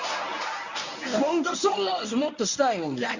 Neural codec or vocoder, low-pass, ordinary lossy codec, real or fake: codec, 24 kHz, 0.9 kbps, WavTokenizer, medium speech release version 1; 7.2 kHz; none; fake